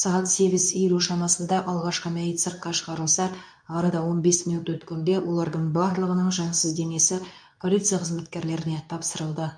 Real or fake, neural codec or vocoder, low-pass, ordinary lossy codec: fake; codec, 24 kHz, 0.9 kbps, WavTokenizer, medium speech release version 2; 9.9 kHz; none